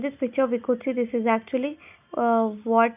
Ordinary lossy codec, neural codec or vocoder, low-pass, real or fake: none; none; 3.6 kHz; real